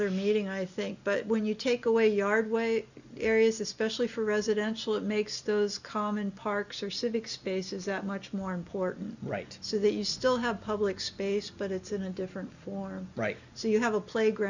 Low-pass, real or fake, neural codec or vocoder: 7.2 kHz; real; none